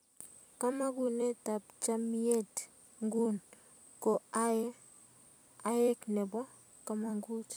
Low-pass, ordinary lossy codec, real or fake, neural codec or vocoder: none; none; fake; vocoder, 44.1 kHz, 128 mel bands every 256 samples, BigVGAN v2